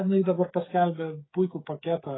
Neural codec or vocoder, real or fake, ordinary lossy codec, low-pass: codec, 16 kHz, 8 kbps, FreqCodec, smaller model; fake; AAC, 16 kbps; 7.2 kHz